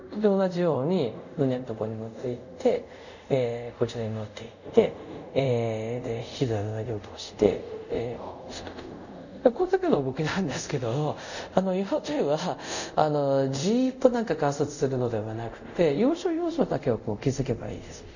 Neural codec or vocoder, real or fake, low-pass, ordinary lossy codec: codec, 24 kHz, 0.5 kbps, DualCodec; fake; 7.2 kHz; none